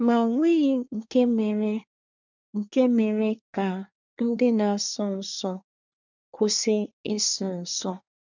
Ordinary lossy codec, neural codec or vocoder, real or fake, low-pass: none; codec, 24 kHz, 1 kbps, SNAC; fake; 7.2 kHz